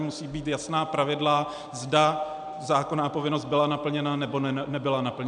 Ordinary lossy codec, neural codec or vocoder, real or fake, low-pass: MP3, 96 kbps; none; real; 9.9 kHz